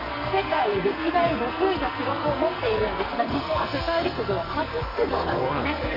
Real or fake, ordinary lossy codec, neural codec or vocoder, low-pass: fake; none; codec, 44.1 kHz, 2.6 kbps, SNAC; 5.4 kHz